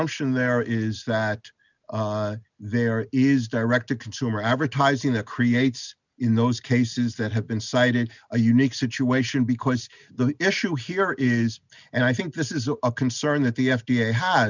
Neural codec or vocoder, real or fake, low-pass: none; real; 7.2 kHz